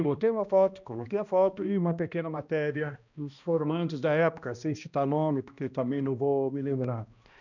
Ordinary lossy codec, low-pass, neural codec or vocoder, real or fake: none; 7.2 kHz; codec, 16 kHz, 1 kbps, X-Codec, HuBERT features, trained on balanced general audio; fake